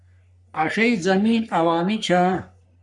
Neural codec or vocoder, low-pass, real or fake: codec, 44.1 kHz, 3.4 kbps, Pupu-Codec; 10.8 kHz; fake